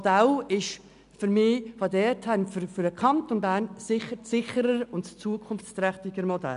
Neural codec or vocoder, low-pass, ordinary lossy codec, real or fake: none; 10.8 kHz; none; real